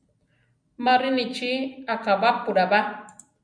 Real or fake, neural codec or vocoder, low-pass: real; none; 9.9 kHz